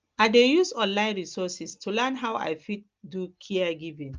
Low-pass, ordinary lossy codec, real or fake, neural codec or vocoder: 7.2 kHz; Opus, 24 kbps; real; none